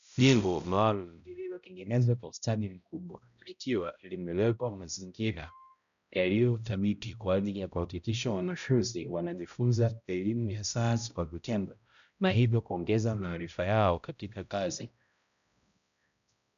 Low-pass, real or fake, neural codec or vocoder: 7.2 kHz; fake; codec, 16 kHz, 0.5 kbps, X-Codec, HuBERT features, trained on balanced general audio